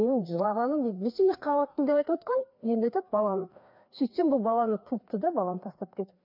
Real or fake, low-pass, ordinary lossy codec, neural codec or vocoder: fake; 5.4 kHz; MP3, 48 kbps; codec, 16 kHz, 2 kbps, FreqCodec, larger model